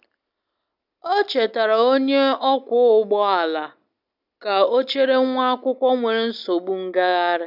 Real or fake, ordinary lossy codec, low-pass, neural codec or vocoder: real; none; 5.4 kHz; none